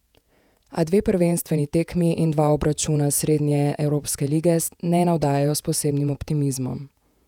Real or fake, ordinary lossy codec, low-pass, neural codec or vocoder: fake; none; 19.8 kHz; vocoder, 48 kHz, 128 mel bands, Vocos